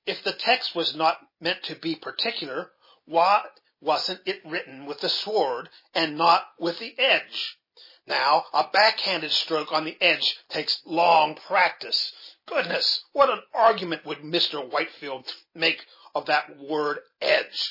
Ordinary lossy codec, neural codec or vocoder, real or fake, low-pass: MP3, 24 kbps; none; real; 5.4 kHz